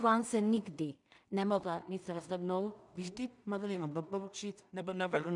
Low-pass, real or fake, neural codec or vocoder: 10.8 kHz; fake; codec, 16 kHz in and 24 kHz out, 0.4 kbps, LongCat-Audio-Codec, two codebook decoder